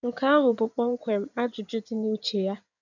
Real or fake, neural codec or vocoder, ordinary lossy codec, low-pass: fake; codec, 16 kHz in and 24 kHz out, 2.2 kbps, FireRedTTS-2 codec; none; 7.2 kHz